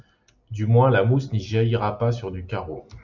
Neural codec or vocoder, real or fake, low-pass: none; real; 7.2 kHz